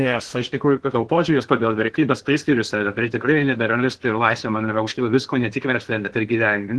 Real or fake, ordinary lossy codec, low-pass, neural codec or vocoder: fake; Opus, 16 kbps; 10.8 kHz; codec, 16 kHz in and 24 kHz out, 0.8 kbps, FocalCodec, streaming, 65536 codes